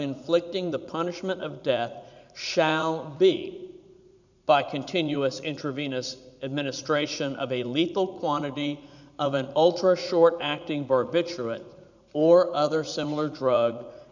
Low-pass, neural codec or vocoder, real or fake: 7.2 kHz; vocoder, 44.1 kHz, 80 mel bands, Vocos; fake